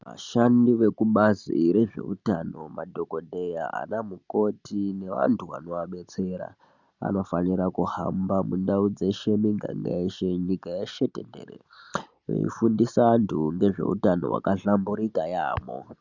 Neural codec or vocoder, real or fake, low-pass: none; real; 7.2 kHz